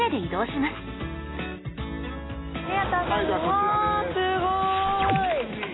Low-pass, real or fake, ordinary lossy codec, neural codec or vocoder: 7.2 kHz; real; AAC, 16 kbps; none